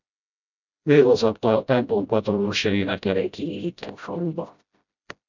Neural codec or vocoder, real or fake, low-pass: codec, 16 kHz, 0.5 kbps, FreqCodec, smaller model; fake; 7.2 kHz